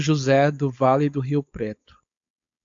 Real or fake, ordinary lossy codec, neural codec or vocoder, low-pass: fake; AAC, 64 kbps; codec, 16 kHz, 4.8 kbps, FACodec; 7.2 kHz